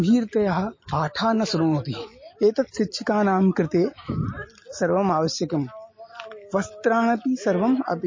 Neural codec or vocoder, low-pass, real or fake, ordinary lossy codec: none; 7.2 kHz; real; MP3, 32 kbps